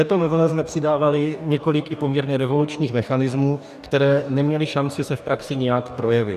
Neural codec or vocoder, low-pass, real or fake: codec, 44.1 kHz, 2.6 kbps, DAC; 14.4 kHz; fake